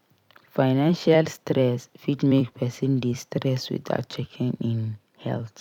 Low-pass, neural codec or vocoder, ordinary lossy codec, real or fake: 19.8 kHz; vocoder, 44.1 kHz, 128 mel bands every 256 samples, BigVGAN v2; none; fake